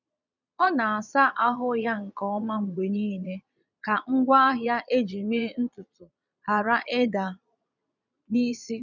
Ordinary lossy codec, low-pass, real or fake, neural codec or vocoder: none; 7.2 kHz; fake; vocoder, 22.05 kHz, 80 mel bands, Vocos